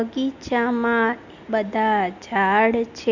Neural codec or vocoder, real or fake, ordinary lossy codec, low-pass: none; real; Opus, 64 kbps; 7.2 kHz